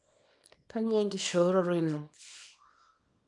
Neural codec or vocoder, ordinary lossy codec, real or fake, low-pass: codec, 24 kHz, 0.9 kbps, WavTokenizer, small release; none; fake; 10.8 kHz